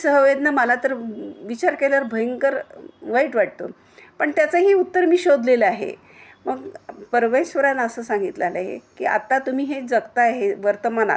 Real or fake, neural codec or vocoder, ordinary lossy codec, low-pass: real; none; none; none